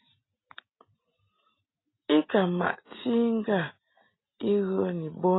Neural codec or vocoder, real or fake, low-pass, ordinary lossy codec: none; real; 7.2 kHz; AAC, 16 kbps